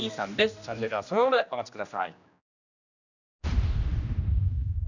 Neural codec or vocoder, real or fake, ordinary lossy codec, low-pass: codec, 16 kHz, 1 kbps, X-Codec, HuBERT features, trained on general audio; fake; none; 7.2 kHz